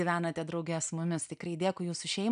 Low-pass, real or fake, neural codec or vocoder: 9.9 kHz; real; none